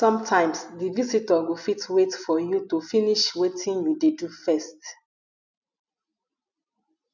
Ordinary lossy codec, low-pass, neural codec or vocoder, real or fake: none; 7.2 kHz; none; real